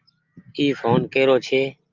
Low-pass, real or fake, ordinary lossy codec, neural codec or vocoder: 7.2 kHz; real; Opus, 32 kbps; none